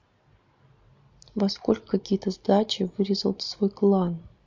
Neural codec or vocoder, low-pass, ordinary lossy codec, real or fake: none; 7.2 kHz; MP3, 48 kbps; real